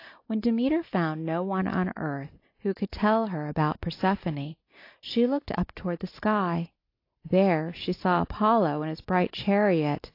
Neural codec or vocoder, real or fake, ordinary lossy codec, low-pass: none; real; AAC, 32 kbps; 5.4 kHz